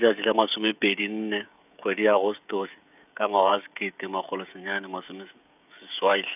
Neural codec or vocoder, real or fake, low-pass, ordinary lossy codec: codec, 16 kHz, 16 kbps, FreqCodec, smaller model; fake; 3.6 kHz; none